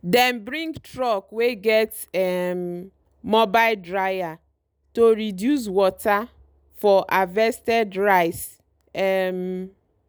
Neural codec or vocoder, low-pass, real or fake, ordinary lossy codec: none; none; real; none